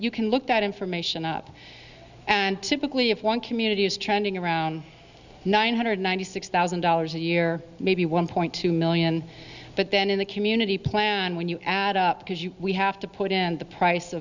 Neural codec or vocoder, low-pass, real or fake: none; 7.2 kHz; real